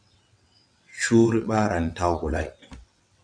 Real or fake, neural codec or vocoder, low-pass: fake; vocoder, 22.05 kHz, 80 mel bands, WaveNeXt; 9.9 kHz